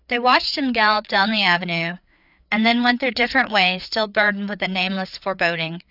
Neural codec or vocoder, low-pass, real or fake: codec, 16 kHz, 4 kbps, FreqCodec, larger model; 5.4 kHz; fake